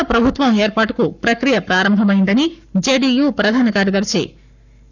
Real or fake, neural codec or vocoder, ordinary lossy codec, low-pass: fake; codec, 44.1 kHz, 7.8 kbps, Pupu-Codec; none; 7.2 kHz